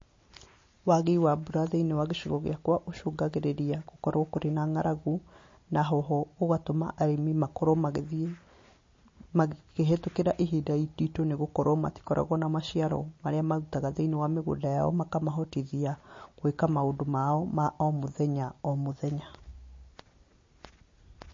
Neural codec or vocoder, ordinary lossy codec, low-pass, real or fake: none; MP3, 32 kbps; 7.2 kHz; real